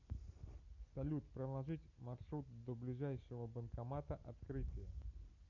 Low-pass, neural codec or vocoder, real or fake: 7.2 kHz; none; real